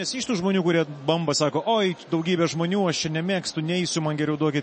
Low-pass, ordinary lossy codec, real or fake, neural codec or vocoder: 9.9 kHz; MP3, 32 kbps; real; none